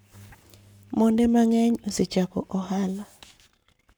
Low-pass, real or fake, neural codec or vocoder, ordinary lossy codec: none; fake; codec, 44.1 kHz, 7.8 kbps, Pupu-Codec; none